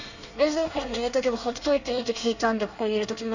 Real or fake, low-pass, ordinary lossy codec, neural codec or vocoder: fake; 7.2 kHz; none; codec, 24 kHz, 1 kbps, SNAC